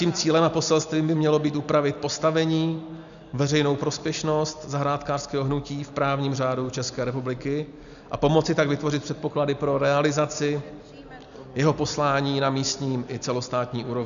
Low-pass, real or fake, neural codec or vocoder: 7.2 kHz; real; none